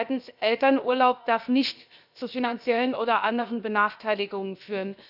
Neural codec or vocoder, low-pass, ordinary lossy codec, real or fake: codec, 16 kHz, 0.7 kbps, FocalCodec; 5.4 kHz; none; fake